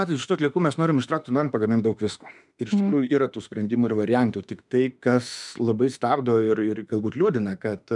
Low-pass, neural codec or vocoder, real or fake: 10.8 kHz; autoencoder, 48 kHz, 32 numbers a frame, DAC-VAE, trained on Japanese speech; fake